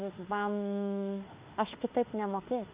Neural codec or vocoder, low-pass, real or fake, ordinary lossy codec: autoencoder, 48 kHz, 32 numbers a frame, DAC-VAE, trained on Japanese speech; 3.6 kHz; fake; Opus, 64 kbps